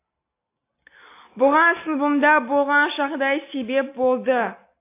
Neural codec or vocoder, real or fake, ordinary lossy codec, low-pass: none; real; AAC, 24 kbps; 3.6 kHz